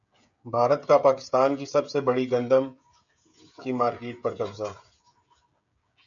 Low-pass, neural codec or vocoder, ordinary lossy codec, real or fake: 7.2 kHz; codec, 16 kHz, 16 kbps, FreqCodec, smaller model; MP3, 96 kbps; fake